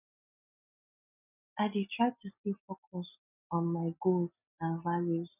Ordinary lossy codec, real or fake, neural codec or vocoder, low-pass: none; real; none; 3.6 kHz